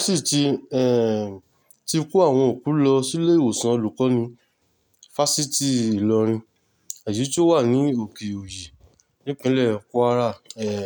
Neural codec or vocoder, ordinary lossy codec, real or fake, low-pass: none; none; real; none